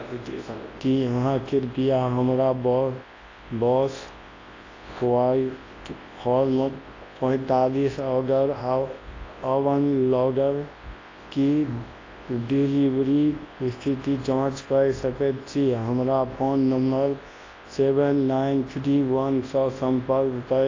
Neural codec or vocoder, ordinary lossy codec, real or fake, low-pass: codec, 24 kHz, 0.9 kbps, WavTokenizer, large speech release; AAC, 32 kbps; fake; 7.2 kHz